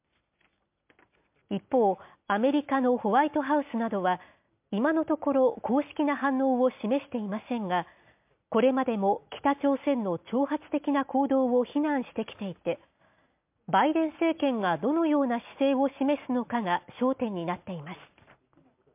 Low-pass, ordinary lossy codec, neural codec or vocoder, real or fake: 3.6 kHz; MP3, 32 kbps; none; real